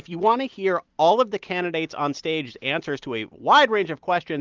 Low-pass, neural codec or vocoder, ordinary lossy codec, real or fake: 7.2 kHz; none; Opus, 32 kbps; real